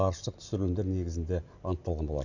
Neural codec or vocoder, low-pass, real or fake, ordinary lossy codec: none; 7.2 kHz; real; none